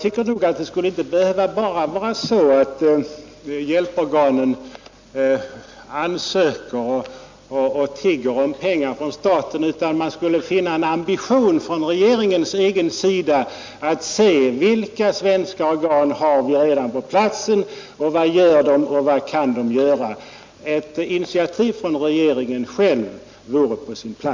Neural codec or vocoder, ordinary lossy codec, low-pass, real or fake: none; MP3, 64 kbps; 7.2 kHz; real